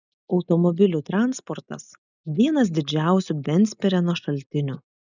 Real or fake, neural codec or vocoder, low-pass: real; none; 7.2 kHz